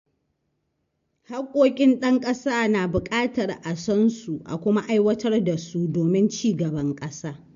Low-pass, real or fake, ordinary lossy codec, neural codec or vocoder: 7.2 kHz; real; MP3, 48 kbps; none